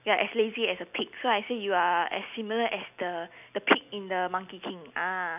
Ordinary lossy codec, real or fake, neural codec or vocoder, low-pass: none; real; none; 3.6 kHz